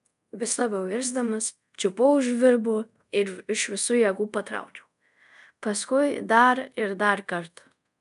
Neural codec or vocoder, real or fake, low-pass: codec, 24 kHz, 0.5 kbps, DualCodec; fake; 10.8 kHz